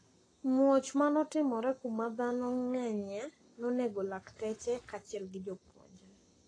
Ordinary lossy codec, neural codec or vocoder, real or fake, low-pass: AAC, 32 kbps; codec, 44.1 kHz, 7.8 kbps, Pupu-Codec; fake; 9.9 kHz